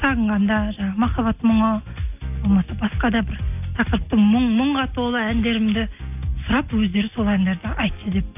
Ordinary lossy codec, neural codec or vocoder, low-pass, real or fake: none; none; 3.6 kHz; real